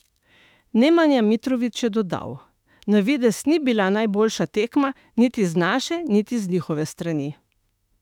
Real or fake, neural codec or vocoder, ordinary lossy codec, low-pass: fake; autoencoder, 48 kHz, 32 numbers a frame, DAC-VAE, trained on Japanese speech; none; 19.8 kHz